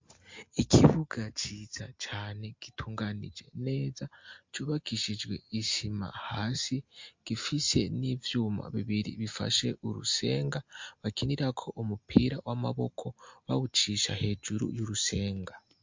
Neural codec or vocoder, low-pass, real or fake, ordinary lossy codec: none; 7.2 kHz; real; MP3, 48 kbps